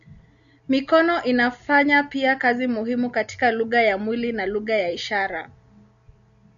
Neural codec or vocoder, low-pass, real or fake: none; 7.2 kHz; real